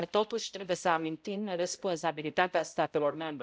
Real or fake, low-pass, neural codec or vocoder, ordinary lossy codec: fake; none; codec, 16 kHz, 0.5 kbps, X-Codec, HuBERT features, trained on balanced general audio; none